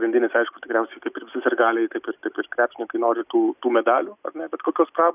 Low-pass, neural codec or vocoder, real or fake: 3.6 kHz; none; real